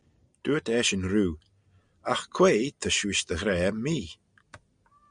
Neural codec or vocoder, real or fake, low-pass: none; real; 9.9 kHz